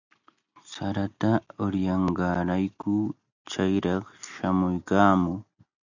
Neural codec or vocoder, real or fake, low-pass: none; real; 7.2 kHz